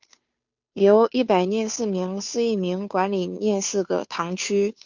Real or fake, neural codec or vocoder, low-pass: fake; codec, 16 kHz, 2 kbps, FunCodec, trained on Chinese and English, 25 frames a second; 7.2 kHz